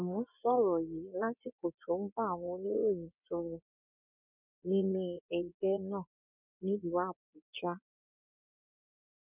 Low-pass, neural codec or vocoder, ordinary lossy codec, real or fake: 3.6 kHz; vocoder, 24 kHz, 100 mel bands, Vocos; none; fake